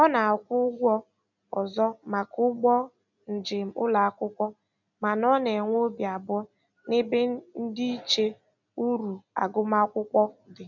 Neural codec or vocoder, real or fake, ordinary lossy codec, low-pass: none; real; none; 7.2 kHz